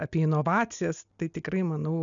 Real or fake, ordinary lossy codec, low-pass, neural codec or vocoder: real; MP3, 96 kbps; 7.2 kHz; none